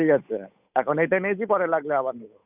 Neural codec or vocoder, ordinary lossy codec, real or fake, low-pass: codec, 16 kHz, 8 kbps, FunCodec, trained on Chinese and English, 25 frames a second; none; fake; 3.6 kHz